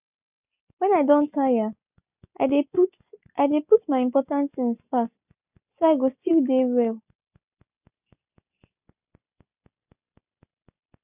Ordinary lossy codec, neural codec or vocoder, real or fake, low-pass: none; none; real; 3.6 kHz